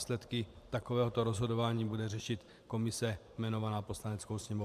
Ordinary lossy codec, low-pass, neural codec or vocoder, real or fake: MP3, 96 kbps; 14.4 kHz; vocoder, 44.1 kHz, 128 mel bands every 512 samples, BigVGAN v2; fake